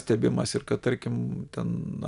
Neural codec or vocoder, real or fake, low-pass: none; real; 10.8 kHz